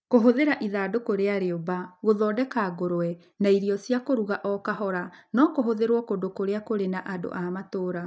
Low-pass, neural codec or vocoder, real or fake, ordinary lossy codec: none; none; real; none